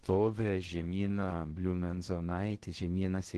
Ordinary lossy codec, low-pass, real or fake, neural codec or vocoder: Opus, 16 kbps; 10.8 kHz; fake; codec, 16 kHz in and 24 kHz out, 0.6 kbps, FocalCodec, streaming, 4096 codes